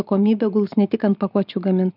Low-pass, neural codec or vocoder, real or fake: 5.4 kHz; none; real